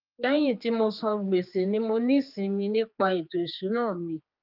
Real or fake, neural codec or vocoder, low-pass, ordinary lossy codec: fake; codec, 16 kHz, 4 kbps, X-Codec, HuBERT features, trained on general audio; 5.4 kHz; Opus, 24 kbps